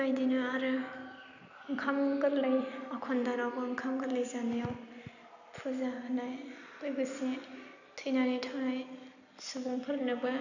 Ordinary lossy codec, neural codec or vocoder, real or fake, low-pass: none; none; real; 7.2 kHz